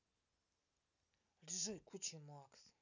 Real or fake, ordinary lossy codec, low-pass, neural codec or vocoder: real; none; 7.2 kHz; none